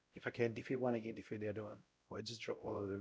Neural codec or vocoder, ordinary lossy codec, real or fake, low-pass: codec, 16 kHz, 0.5 kbps, X-Codec, WavLM features, trained on Multilingual LibriSpeech; none; fake; none